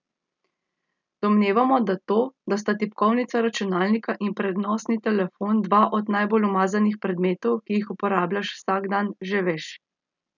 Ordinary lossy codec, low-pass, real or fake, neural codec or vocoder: none; 7.2 kHz; real; none